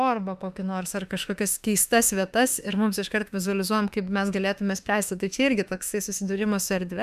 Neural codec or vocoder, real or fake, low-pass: autoencoder, 48 kHz, 32 numbers a frame, DAC-VAE, trained on Japanese speech; fake; 14.4 kHz